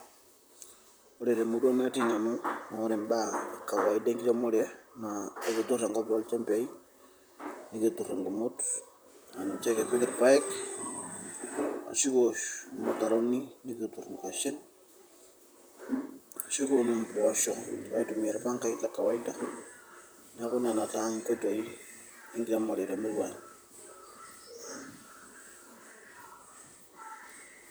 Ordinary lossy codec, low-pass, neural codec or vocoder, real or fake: none; none; vocoder, 44.1 kHz, 128 mel bands, Pupu-Vocoder; fake